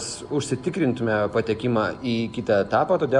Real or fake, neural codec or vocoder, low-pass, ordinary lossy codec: real; none; 10.8 kHz; Opus, 64 kbps